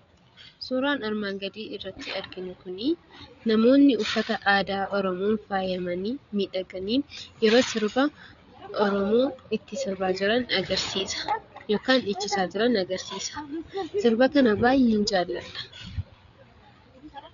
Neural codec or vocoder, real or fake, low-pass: codec, 16 kHz, 8 kbps, FreqCodec, larger model; fake; 7.2 kHz